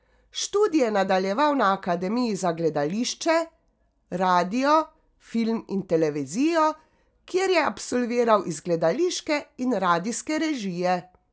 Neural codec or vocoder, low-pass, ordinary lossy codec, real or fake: none; none; none; real